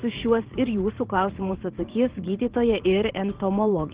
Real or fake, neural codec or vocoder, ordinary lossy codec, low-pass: fake; vocoder, 44.1 kHz, 80 mel bands, Vocos; Opus, 16 kbps; 3.6 kHz